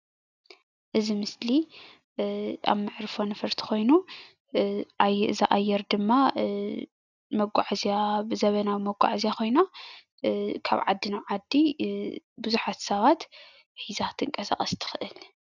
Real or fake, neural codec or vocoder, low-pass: real; none; 7.2 kHz